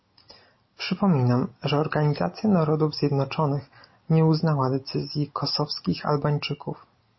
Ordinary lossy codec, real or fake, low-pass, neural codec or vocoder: MP3, 24 kbps; real; 7.2 kHz; none